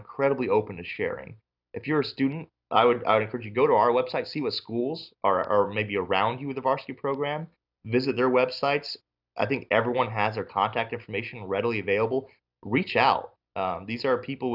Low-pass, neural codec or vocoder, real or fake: 5.4 kHz; none; real